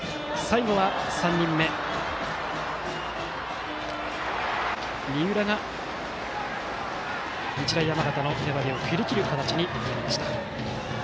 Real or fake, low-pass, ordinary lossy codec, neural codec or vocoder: real; none; none; none